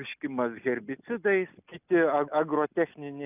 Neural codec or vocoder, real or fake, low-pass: none; real; 3.6 kHz